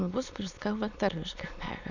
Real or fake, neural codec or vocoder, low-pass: fake; autoencoder, 22.05 kHz, a latent of 192 numbers a frame, VITS, trained on many speakers; 7.2 kHz